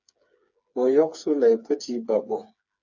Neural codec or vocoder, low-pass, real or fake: codec, 16 kHz, 4 kbps, FreqCodec, smaller model; 7.2 kHz; fake